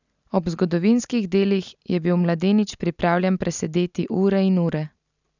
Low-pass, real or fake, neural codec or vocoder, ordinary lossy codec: 7.2 kHz; real; none; none